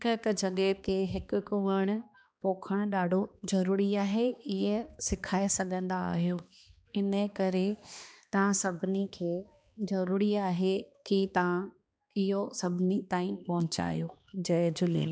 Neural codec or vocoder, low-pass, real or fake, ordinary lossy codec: codec, 16 kHz, 2 kbps, X-Codec, HuBERT features, trained on balanced general audio; none; fake; none